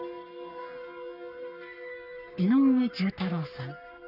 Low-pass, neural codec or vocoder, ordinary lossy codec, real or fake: 5.4 kHz; codec, 44.1 kHz, 3.4 kbps, Pupu-Codec; none; fake